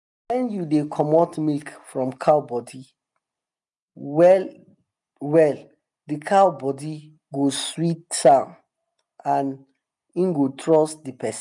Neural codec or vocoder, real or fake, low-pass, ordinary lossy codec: none; real; 10.8 kHz; none